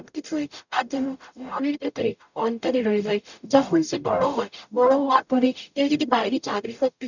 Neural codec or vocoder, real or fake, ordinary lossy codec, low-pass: codec, 44.1 kHz, 0.9 kbps, DAC; fake; none; 7.2 kHz